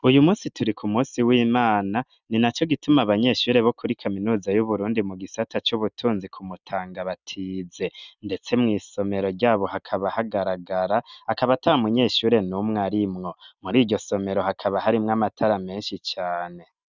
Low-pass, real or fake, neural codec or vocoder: 7.2 kHz; real; none